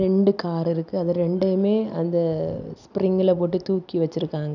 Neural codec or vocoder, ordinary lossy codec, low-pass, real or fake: none; none; 7.2 kHz; real